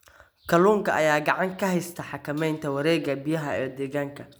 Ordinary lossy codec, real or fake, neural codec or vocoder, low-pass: none; real; none; none